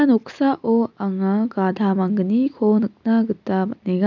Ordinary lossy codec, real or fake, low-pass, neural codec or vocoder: none; real; 7.2 kHz; none